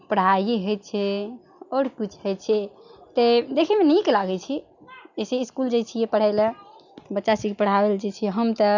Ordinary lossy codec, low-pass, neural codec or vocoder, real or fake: none; 7.2 kHz; none; real